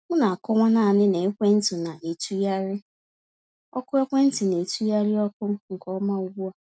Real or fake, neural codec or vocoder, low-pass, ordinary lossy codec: real; none; none; none